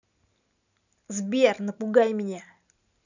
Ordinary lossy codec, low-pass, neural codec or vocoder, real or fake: none; 7.2 kHz; none; real